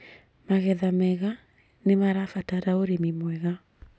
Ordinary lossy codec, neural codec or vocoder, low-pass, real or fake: none; none; none; real